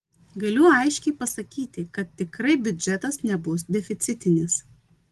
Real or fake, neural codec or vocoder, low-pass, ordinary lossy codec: real; none; 14.4 kHz; Opus, 24 kbps